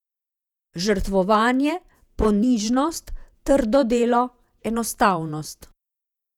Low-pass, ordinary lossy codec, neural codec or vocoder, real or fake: 19.8 kHz; none; vocoder, 48 kHz, 128 mel bands, Vocos; fake